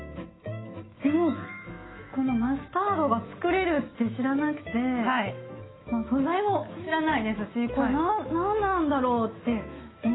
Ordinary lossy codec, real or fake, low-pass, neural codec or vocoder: AAC, 16 kbps; fake; 7.2 kHz; autoencoder, 48 kHz, 128 numbers a frame, DAC-VAE, trained on Japanese speech